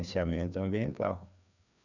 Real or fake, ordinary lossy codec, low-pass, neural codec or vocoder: fake; none; 7.2 kHz; codec, 16 kHz, 2 kbps, FreqCodec, larger model